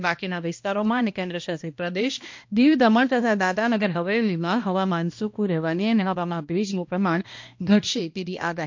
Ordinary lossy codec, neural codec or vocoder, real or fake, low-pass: MP3, 48 kbps; codec, 16 kHz, 1 kbps, X-Codec, HuBERT features, trained on balanced general audio; fake; 7.2 kHz